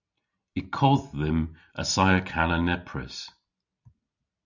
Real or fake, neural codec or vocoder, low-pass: real; none; 7.2 kHz